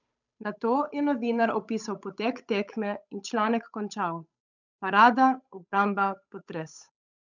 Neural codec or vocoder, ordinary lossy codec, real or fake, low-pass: codec, 16 kHz, 8 kbps, FunCodec, trained on Chinese and English, 25 frames a second; none; fake; 7.2 kHz